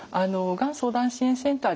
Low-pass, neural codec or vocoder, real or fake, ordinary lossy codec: none; none; real; none